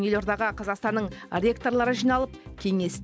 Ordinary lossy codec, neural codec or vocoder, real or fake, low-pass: none; none; real; none